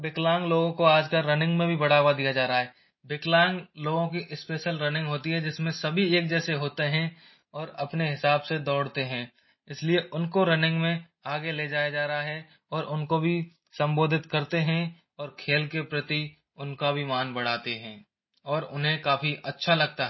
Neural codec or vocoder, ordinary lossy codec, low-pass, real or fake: none; MP3, 24 kbps; 7.2 kHz; real